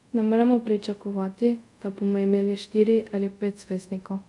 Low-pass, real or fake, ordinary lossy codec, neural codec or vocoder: 10.8 kHz; fake; none; codec, 24 kHz, 0.5 kbps, DualCodec